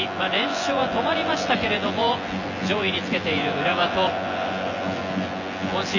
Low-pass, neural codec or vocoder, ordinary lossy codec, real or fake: 7.2 kHz; vocoder, 24 kHz, 100 mel bands, Vocos; none; fake